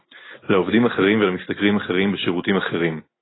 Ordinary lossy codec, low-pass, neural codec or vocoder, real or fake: AAC, 16 kbps; 7.2 kHz; none; real